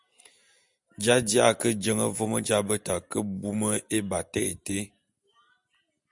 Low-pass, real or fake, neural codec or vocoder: 10.8 kHz; real; none